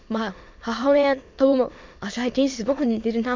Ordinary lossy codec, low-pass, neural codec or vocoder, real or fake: MP3, 48 kbps; 7.2 kHz; autoencoder, 22.05 kHz, a latent of 192 numbers a frame, VITS, trained on many speakers; fake